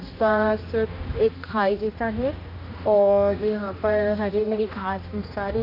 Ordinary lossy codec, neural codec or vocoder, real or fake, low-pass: MP3, 32 kbps; codec, 16 kHz, 1 kbps, X-Codec, HuBERT features, trained on general audio; fake; 5.4 kHz